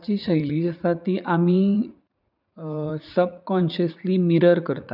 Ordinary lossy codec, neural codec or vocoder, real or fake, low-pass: none; vocoder, 44.1 kHz, 80 mel bands, Vocos; fake; 5.4 kHz